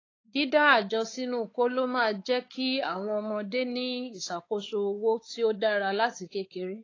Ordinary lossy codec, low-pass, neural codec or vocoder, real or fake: AAC, 32 kbps; 7.2 kHz; codec, 16 kHz, 4 kbps, X-Codec, WavLM features, trained on Multilingual LibriSpeech; fake